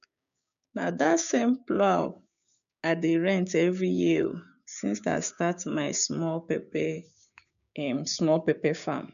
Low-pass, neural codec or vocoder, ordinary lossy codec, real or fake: 7.2 kHz; codec, 16 kHz, 6 kbps, DAC; none; fake